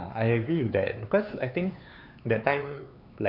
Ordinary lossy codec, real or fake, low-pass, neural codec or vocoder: none; fake; 5.4 kHz; codec, 16 kHz, 4 kbps, X-Codec, HuBERT features, trained on LibriSpeech